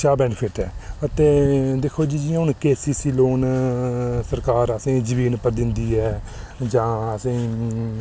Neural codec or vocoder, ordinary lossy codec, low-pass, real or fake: none; none; none; real